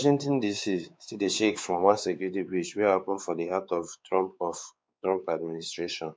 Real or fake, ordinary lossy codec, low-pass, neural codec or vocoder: fake; none; none; codec, 16 kHz, 4 kbps, X-Codec, WavLM features, trained on Multilingual LibriSpeech